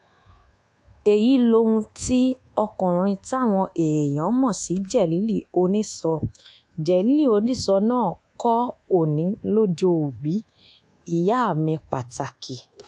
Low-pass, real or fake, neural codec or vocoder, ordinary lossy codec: 10.8 kHz; fake; codec, 24 kHz, 1.2 kbps, DualCodec; AAC, 64 kbps